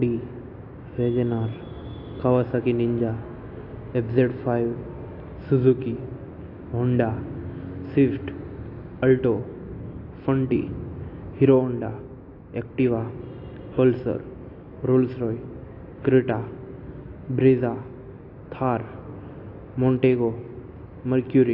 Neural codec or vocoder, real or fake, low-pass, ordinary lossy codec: none; real; 5.4 kHz; AAC, 32 kbps